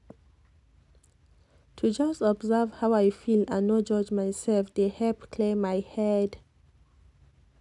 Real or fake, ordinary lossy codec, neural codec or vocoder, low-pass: real; none; none; 10.8 kHz